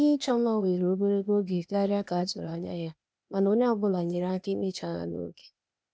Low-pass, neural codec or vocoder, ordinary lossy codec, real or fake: none; codec, 16 kHz, 0.8 kbps, ZipCodec; none; fake